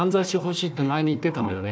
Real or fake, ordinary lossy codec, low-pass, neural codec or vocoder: fake; none; none; codec, 16 kHz, 1 kbps, FunCodec, trained on Chinese and English, 50 frames a second